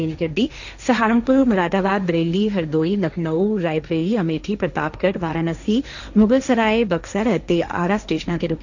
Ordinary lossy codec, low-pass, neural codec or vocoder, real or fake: none; 7.2 kHz; codec, 16 kHz, 1.1 kbps, Voila-Tokenizer; fake